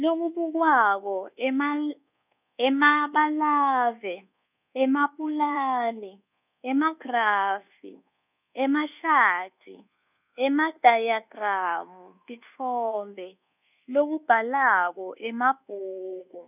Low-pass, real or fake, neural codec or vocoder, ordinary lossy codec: 3.6 kHz; fake; codec, 24 kHz, 1.2 kbps, DualCodec; none